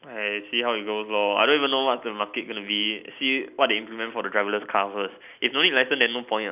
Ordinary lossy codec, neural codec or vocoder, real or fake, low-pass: none; none; real; 3.6 kHz